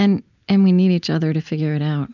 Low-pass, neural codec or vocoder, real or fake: 7.2 kHz; none; real